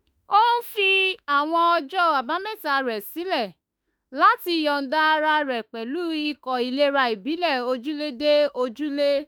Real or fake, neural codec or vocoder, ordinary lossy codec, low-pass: fake; autoencoder, 48 kHz, 32 numbers a frame, DAC-VAE, trained on Japanese speech; none; none